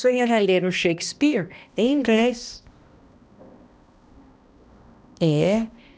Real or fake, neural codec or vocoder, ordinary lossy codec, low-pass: fake; codec, 16 kHz, 1 kbps, X-Codec, HuBERT features, trained on balanced general audio; none; none